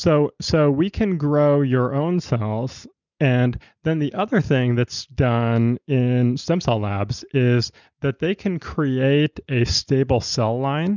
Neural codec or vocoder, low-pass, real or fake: none; 7.2 kHz; real